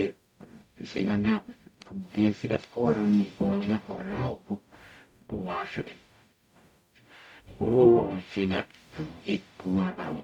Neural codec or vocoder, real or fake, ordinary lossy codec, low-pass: codec, 44.1 kHz, 0.9 kbps, DAC; fake; none; 19.8 kHz